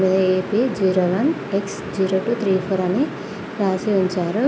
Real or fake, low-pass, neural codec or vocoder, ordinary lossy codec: real; none; none; none